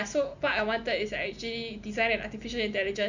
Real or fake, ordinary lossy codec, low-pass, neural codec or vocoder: real; none; 7.2 kHz; none